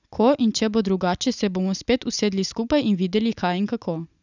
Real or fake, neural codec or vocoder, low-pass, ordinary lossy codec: real; none; 7.2 kHz; none